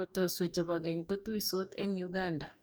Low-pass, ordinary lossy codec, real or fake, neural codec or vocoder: none; none; fake; codec, 44.1 kHz, 2.6 kbps, DAC